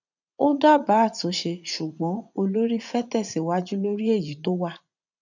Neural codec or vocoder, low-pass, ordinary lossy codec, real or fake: vocoder, 44.1 kHz, 80 mel bands, Vocos; 7.2 kHz; none; fake